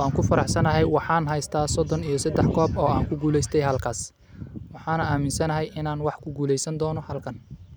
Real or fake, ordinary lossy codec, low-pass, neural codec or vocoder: real; none; none; none